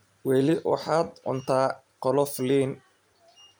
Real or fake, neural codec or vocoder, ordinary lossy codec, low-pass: real; none; none; none